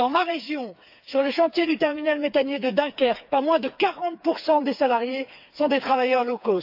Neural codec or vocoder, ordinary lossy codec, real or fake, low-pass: codec, 16 kHz, 4 kbps, FreqCodec, smaller model; AAC, 48 kbps; fake; 5.4 kHz